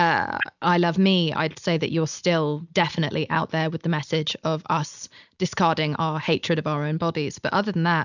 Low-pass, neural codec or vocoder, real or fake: 7.2 kHz; none; real